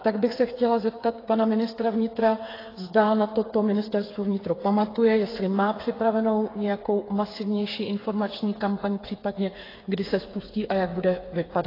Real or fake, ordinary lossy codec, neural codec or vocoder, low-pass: fake; AAC, 24 kbps; codec, 16 kHz, 8 kbps, FreqCodec, smaller model; 5.4 kHz